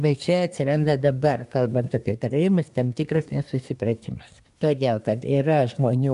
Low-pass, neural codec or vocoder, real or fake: 10.8 kHz; codec, 24 kHz, 1 kbps, SNAC; fake